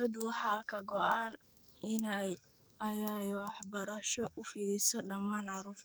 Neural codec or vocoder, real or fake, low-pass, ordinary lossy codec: codec, 44.1 kHz, 2.6 kbps, SNAC; fake; none; none